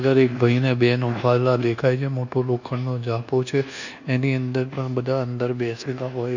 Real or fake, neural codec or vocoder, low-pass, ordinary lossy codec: fake; codec, 24 kHz, 1.2 kbps, DualCodec; 7.2 kHz; none